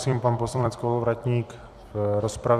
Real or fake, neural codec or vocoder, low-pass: fake; vocoder, 44.1 kHz, 128 mel bands every 256 samples, BigVGAN v2; 14.4 kHz